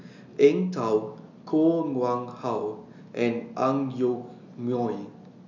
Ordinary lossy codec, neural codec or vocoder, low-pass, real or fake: none; none; 7.2 kHz; real